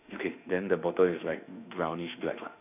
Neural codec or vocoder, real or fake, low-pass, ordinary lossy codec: autoencoder, 48 kHz, 32 numbers a frame, DAC-VAE, trained on Japanese speech; fake; 3.6 kHz; none